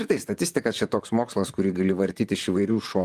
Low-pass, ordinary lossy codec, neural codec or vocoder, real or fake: 14.4 kHz; Opus, 32 kbps; vocoder, 44.1 kHz, 128 mel bands, Pupu-Vocoder; fake